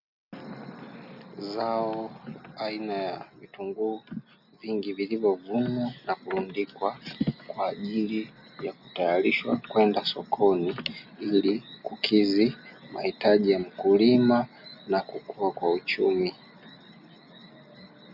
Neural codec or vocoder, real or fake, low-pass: none; real; 5.4 kHz